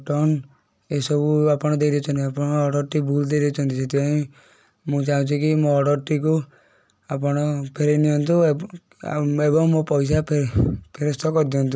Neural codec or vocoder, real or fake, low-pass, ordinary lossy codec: none; real; none; none